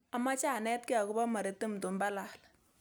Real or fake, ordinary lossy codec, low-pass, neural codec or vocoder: real; none; none; none